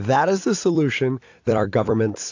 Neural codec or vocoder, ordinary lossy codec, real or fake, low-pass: vocoder, 44.1 kHz, 128 mel bands every 256 samples, BigVGAN v2; AAC, 48 kbps; fake; 7.2 kHz